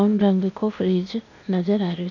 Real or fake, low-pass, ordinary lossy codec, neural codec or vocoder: fake; 7.2 kHz; none; codec, 16 kHz, 0.8 kbps, ZipCodec